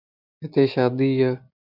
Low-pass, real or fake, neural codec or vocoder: 5.4 kHz; real; none